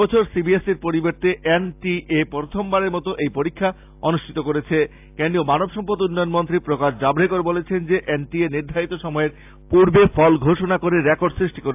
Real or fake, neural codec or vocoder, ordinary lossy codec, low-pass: real; none; AAC, 32 kbps; 3.6 kHz